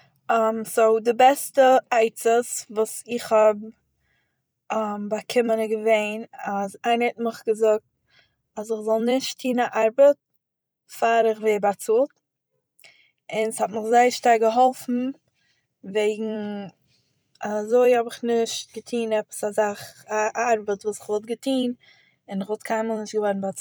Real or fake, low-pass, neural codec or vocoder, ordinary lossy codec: fake; none; vocoder, 44.1 kHz, 128 mel bands every 256 samples, BigVGAN v2; none